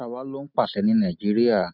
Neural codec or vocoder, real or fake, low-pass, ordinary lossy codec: none; real; 5.4 kHz; none